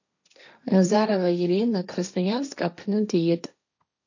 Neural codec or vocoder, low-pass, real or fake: codec, 16 kHz, 1.1 kbps, Voila-Tokenizer; 7.2 kHz; fake